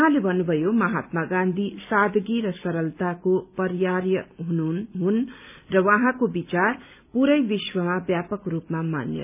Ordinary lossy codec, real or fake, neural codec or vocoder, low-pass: none; real; none; 3.6 kHz